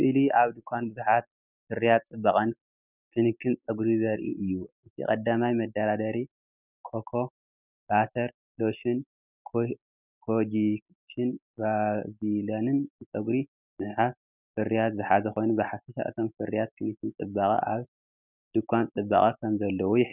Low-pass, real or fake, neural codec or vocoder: 3.6 kHz; real; none